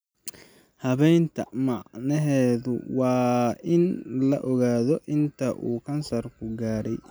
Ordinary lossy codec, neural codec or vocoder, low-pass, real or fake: none; none; none; real